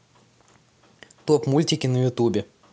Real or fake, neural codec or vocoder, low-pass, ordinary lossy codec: real; none; none; none